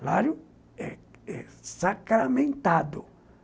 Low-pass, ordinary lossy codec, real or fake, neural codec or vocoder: none; none; real; none